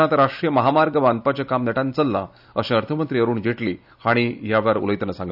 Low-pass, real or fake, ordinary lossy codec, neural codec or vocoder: 5.4 kHz; real; none; none